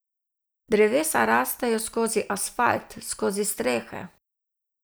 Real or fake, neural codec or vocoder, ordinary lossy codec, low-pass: real; none; none; none